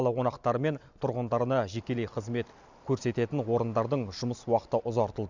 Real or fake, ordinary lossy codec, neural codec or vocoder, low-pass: real; none; none; 7.2 kHz